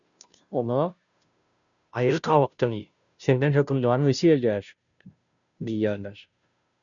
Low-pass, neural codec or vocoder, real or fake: 7.2 kHz; codec, 16 kHz, 0.5 kbps, FunCodec, trained on Chinese and English, 25 frames a second; fake